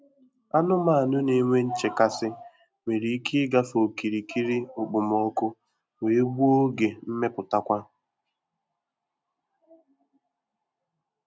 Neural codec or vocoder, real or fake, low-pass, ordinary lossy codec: none; real; none; none